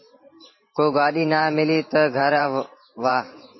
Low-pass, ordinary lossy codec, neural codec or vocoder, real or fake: 7.2 kHz; MP3, 24 kbps; vocoder, 44.1 kHz, 128 mel bands every 512 samples, BigVGAN v2; fake